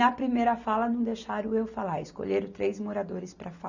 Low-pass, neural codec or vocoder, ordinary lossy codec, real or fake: 7.2 kHz; none; none; real